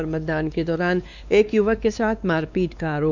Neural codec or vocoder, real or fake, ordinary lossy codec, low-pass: codec, 16 kHz, 2 kbps, X-Codec, WavLM features, trained on Multilingual LibriSpeech; fake; none; 7.2 kHz